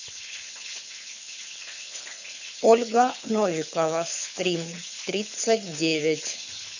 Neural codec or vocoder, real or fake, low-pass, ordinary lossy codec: codec, 24 kHz, 6 kbps, HILCodec; fake; 7.2 kHz; none